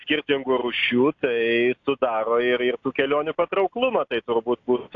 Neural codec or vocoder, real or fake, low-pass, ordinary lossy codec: none; real; 7.2 kHz; AAC, 48 kbps